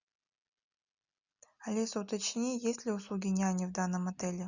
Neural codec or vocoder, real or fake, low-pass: none; real; 7.2 kHz